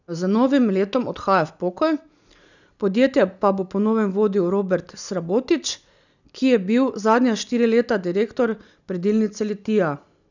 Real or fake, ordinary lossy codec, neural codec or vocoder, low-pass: real; none; none; 7.2 kHz